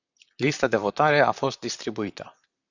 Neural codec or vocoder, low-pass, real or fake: vocoder, 44.1 kHz, 128 mel bands, Pupu-Vocoder; 7.2 kHz; fake